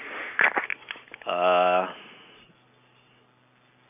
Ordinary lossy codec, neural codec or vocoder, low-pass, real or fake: none; none; 3.6 kHz; real